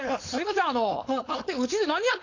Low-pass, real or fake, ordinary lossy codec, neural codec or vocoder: 7.2 kHz; fake; AAC, 48 kbps; codec, 16 kHz, 4.8 kbps, FACodec